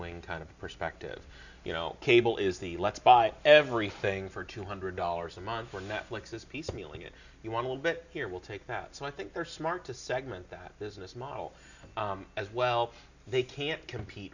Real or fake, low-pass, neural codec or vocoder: real; 7.2 kHz; none